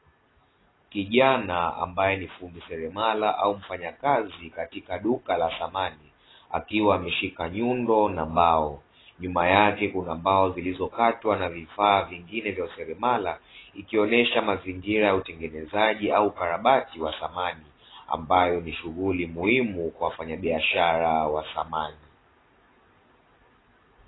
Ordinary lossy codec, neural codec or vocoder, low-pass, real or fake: AAC, 16 kbps; none; 7.2 kHz; real